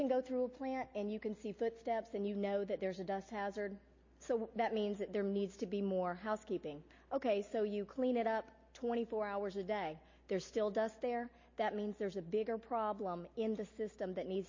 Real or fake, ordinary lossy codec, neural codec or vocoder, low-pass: real; MP3, 32 kbps; none; 7.2 kHz